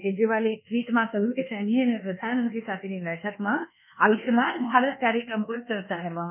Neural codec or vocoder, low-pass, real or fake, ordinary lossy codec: codec, 24 kHz, 1.2 kbps, DualCodec; 3.6 kHz; fake; none